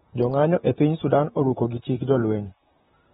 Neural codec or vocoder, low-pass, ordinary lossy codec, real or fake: vocoder, 44.1 kHz, 128 mel bands, Pupu-Vocoder; 19.8 kHz; AAC, 16 kbps; fake